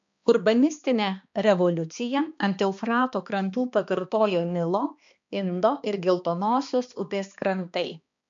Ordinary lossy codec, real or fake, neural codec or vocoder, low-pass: MP3, 64 kbps; fake; codec, 16 kHz, 2 kbps, X-Codec, HuBERT features, trained on balanced general audio; 7.2 kHz